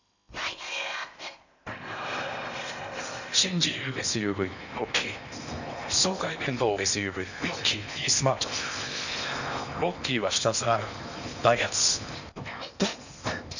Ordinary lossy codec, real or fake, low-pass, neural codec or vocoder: none; fake; 7.2 kHz; codec, 16 kHz in and 24 kHz out, 0.8 kbps, FocalCodec, streaming, 65536 codes